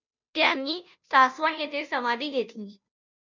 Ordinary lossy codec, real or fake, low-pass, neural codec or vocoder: MP3, 64 kbps; fake; 7.2 kHz; codec, 16 kHz, 0.5 kbps, FunCodec, trained on Chinese and English, 25 frames a second